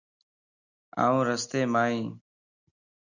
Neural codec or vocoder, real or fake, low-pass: none; real; 7.2 kHz